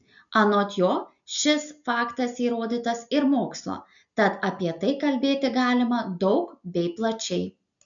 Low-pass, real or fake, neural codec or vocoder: 7.2 kHz; real; none